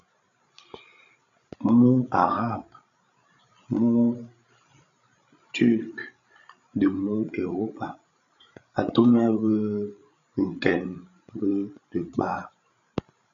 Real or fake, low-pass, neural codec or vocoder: fake; 7.2 kHz; codec, 16 kHz, 16 kbps, FreqCodec, larger model